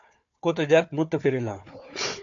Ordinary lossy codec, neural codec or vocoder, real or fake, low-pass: AAC, 64 kbps; codec, 16 kHz, 4 kbps, FunCodec, trained on Chinese and English, 50 frames a second; fake; 7.2 kHz